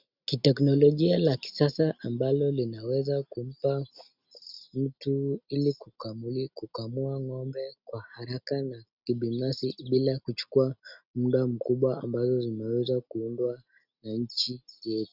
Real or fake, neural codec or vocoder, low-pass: real; none; 5.4 kHz